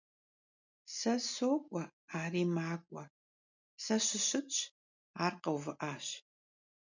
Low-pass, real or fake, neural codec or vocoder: 7.2 kHz; real; none